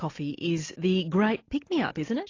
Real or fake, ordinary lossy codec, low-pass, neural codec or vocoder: real; AAC, 32 kbps; 7.2 kHz; none